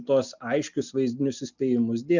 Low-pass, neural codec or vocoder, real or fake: 7.2 kHz; none; real